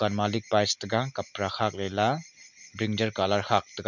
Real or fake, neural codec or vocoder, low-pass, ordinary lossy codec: real; none; 7.2 kHz; none